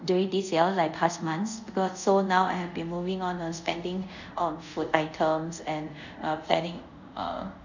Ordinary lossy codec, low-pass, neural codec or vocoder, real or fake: none; 7.2 kHz; codec, 24 kHz, 0.5 kbps, DualCodec; fake